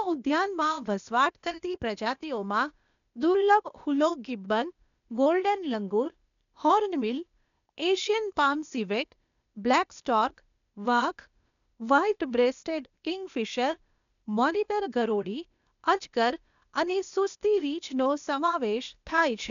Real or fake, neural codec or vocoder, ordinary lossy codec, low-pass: fake; codec, 16 kHz, 0.8 kbps, ZipCodec; none; 7.2 kHz